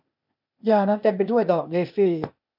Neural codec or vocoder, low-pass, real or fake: codec, 16 kHz, 0.8 kbps, ZipCodec; 5.4 kHz; fake